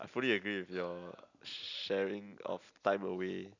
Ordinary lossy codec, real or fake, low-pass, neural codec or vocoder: none; real; 7.2 kHz; none